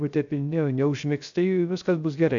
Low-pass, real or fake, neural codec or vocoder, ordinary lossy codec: 7.2 kHz; fake; codec, 16 kHz, 0.3 kbps, FocalCodec; AAC, 64 kbps